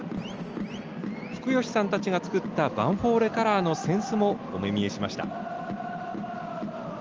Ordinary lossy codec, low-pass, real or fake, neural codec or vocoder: Opus, 24 kbps; 7.2 kHz; real; none